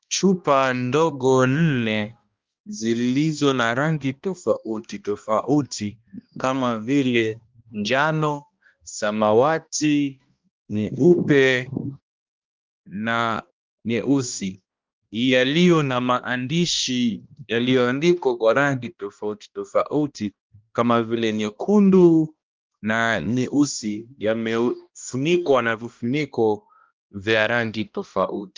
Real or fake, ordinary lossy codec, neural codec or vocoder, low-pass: fake; Opus, 32 kbps; codec, 16 kHz, 1 kbps, X-Codec, HuBERT features, trained on balanced general audio; 7.2 kHz